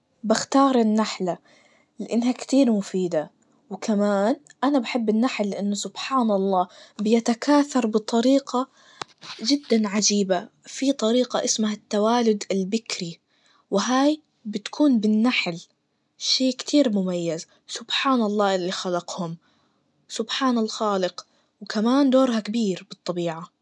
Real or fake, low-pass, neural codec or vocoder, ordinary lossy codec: real; 10.8 kHz; none; none